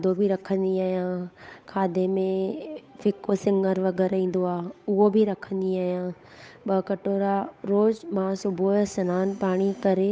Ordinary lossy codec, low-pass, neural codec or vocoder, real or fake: none; none; codec, 16 kHz, 8 kbps, FunCodec, trained on Chinese and English, 25 frames a second; fake